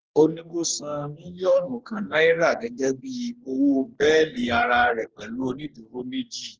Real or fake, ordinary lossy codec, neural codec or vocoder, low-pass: fake; Opus, 16 kbps; codec, 44.1 kHz, 2.6 kbps, DAC; 7.2 kHz